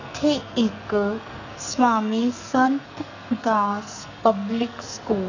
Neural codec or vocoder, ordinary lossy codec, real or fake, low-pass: codec, 44.1 kHz, 2.6 kbps, SNAC; none; fake; 7.2 kHz